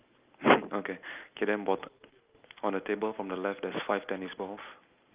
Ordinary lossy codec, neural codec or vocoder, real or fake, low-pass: Opus, 24 kbps; none; real; 3.6 kHz